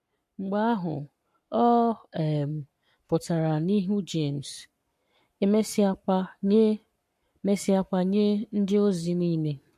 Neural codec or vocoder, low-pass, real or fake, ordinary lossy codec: codec, 44.1 kHz, 7.8 kbps, Pupu-Codec; 14.4 kHz; fake; MP3, 64 kbps